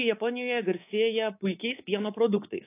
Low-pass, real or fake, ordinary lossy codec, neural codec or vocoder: 3.6 kHz; fake; AAC, 24 kbps; codec, 24 kHz, 3.1 kbps, DualCodec